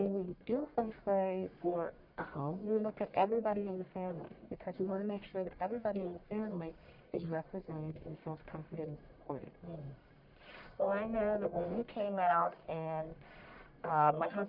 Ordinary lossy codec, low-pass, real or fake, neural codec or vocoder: Opus, 64 kbps; 5.4 kHz; fake; codec, 44.1 kHz, 1.7 kbps, Pupu-Codec